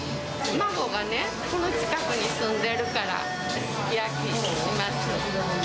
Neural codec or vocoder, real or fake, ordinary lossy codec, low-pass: none; real; none; none